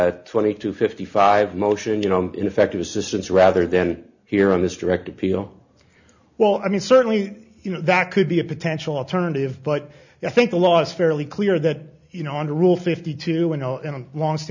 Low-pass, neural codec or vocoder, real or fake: 7.2 kHz; none; real